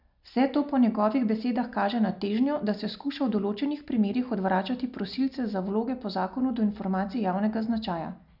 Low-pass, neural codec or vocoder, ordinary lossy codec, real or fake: 5.4 kHz; none; none; real